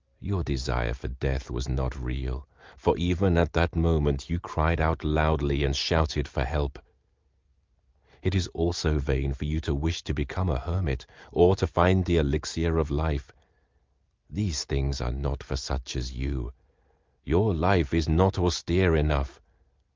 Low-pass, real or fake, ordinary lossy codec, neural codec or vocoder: 7.2 kHz; real; Opus, 24 kbps; none